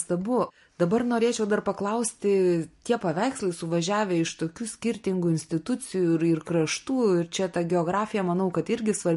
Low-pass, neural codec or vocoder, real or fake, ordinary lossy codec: 14.4 kHz; none; real; MP3, 48 kbps